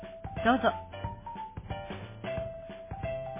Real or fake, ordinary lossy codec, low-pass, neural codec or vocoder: real; MP3, 16 kbps; 3.6 kHz; none